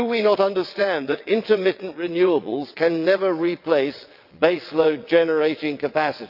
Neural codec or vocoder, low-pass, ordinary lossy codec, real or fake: vocoder, 22.05 kHz, 80 mel bands, WaveNeXt; 5.4 kHz; AAC, 48 kbps; fake